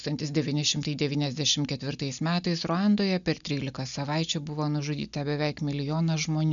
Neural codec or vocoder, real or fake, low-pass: none; real; 7.2 kHz